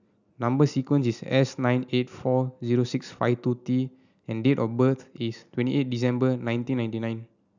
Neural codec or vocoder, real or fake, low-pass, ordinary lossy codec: none; real; 7.2 kHz; none